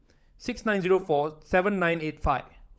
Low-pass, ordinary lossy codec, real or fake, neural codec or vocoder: none; none; fake; codec, 16 kHz, 16 kbps, FunCodec, trained on LibriTTS, 50 frames a second